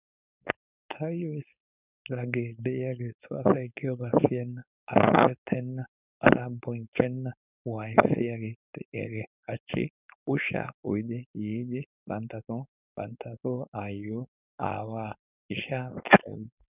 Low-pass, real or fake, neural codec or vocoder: 3.6 kHz; fake; codec, 16 kHz, 4.8 kbps, FACodec